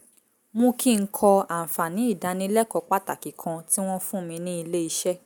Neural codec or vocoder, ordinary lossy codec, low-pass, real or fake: none; none; none; real